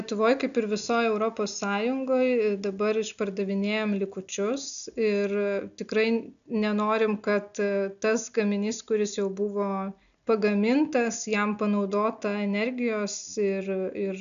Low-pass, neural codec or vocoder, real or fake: 7.2 kHz; none; real